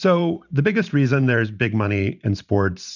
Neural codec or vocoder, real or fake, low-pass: vocoder, 44.1 kHz, 128 mel bands every 512 samples, BigVGAN v2; fake; 7.2 kHz